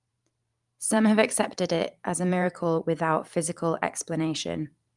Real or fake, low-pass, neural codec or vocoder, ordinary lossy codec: fake; 10.8 kHz; vocoder, 44.1 kHz, 128 mel bands every 512 samples, BigVGAN v2; Opus, 32 kbps